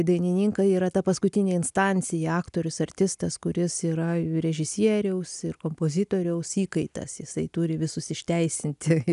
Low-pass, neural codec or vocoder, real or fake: 10.8 kHz; none; real